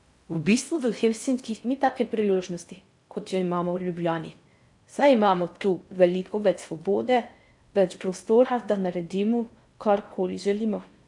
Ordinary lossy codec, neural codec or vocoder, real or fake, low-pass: AAC, 64 kbps; codec, 16 kHz in and 24 kHz out, 0.6 kbps, FocalCodec, streaming, 4096 codes; fake; 10.8 kHz